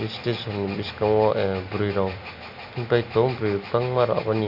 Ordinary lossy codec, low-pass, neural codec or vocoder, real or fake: none; 5.4 kHz; none; real